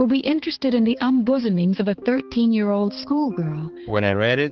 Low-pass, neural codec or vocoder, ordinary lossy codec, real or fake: 7.2 kHz; codec, 16 kHz, 4 kbps, X-Codec, HuBERT features, trained on balanced general audio; Opus, 16 kbps; fake